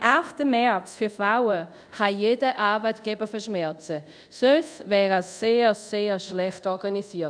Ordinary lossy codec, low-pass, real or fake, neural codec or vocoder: none; 9.9 kHz; fake; codec, 24 kHz, 0.5 kbps, DualCodec